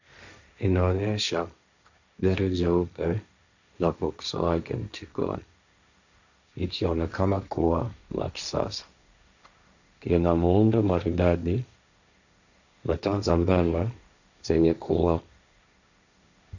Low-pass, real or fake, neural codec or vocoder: 7.2 kHz; fake; codec, 16 kHz, 1.1 kbps, Voila-Tokenizer